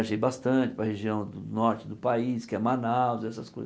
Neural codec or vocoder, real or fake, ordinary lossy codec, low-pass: none; real; none; none